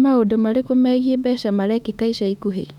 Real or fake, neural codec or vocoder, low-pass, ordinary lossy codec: fake; autoencoder, 48 kHz, 32 numbers a frame, DAC-VAE, trained on Japanese speech; 19.8 kHz; none